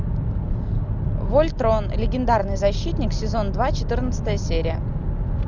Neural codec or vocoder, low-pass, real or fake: none; 7.2 kHz; real